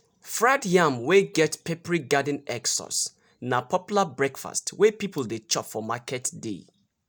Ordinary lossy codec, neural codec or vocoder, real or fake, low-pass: none; none; real; none